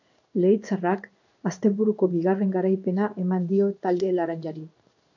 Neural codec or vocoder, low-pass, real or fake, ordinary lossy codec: codec, 16 kHz in and 24 kHz out, 1 kbps, XY-Tokenizer; 7.2 kHz; fake; AAC, 48 kbps